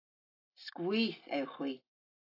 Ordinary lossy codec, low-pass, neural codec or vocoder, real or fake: AAC, 24 kbps; 5.4 kHz; none; real